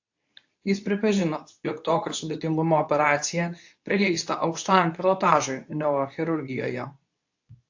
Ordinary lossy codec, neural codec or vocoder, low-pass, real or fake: AAC, 48 kbps; codec, 24 kHz, 0.9 kbps, WavTokenizer, medium speech release version 2; 7.2 kHz; fake